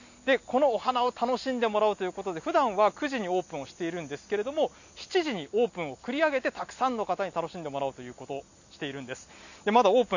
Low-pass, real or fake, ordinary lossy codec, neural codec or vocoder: 7.2 kHz; real; none; none